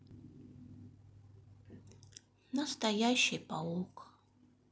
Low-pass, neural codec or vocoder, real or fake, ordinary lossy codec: none; none; real; none